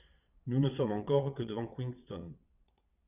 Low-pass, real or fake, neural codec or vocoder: 3.6 kHz; fake; vocoder, 22.05 kHz, 80 mel bands, WaveNeXt